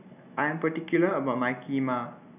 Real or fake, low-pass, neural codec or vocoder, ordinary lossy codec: real; 3.6 kHz; none; none